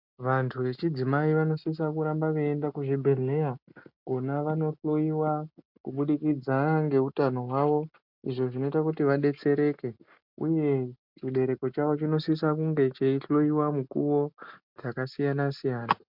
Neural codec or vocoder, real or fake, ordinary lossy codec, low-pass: none; real; MP3, 48 kbps; 5.4 kHz